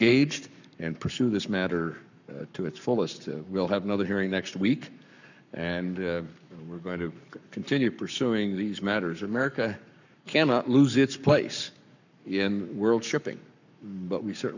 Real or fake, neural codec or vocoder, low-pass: fake; codec, 44.1 kHz, 7.8 kbps, Pupu-Codec; 7.2 kHz